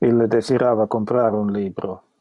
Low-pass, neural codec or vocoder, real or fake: 10.8 kHz; none; real